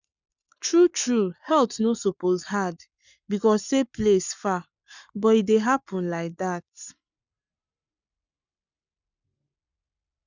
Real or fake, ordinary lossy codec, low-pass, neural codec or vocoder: fake; none; 7.2 kHz; codec, 44.1 kHz, 7.8 kbps, Pupu-Codec